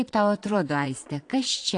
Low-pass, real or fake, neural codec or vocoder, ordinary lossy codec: 9.9 kHz; fake; vocoder, 22.05 kHz, 80 mel bands, Vocos; AAC, 48 kbps